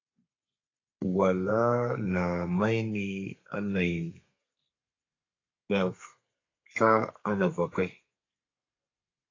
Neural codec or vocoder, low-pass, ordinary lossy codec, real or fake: codec, 32 kHz, 1.9 kbps, SNAC; 7.2 kHz; AAC, 32 kbps; fake